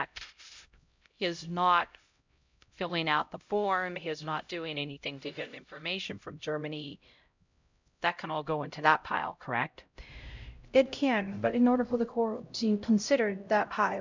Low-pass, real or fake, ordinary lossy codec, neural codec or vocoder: 7.2 kHz; fake; MP3, 64 kbps; codec, 16 kHz, 0.5 kbps, X-Codec, HuBERT features, trained on LibriSpeech